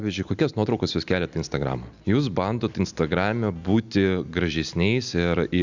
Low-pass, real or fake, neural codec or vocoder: 7.2 kHz; real; none